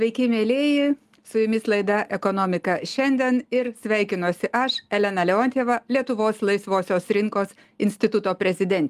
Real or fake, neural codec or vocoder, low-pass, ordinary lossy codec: real; none; 14.4 kHz; Opus, 32 kbps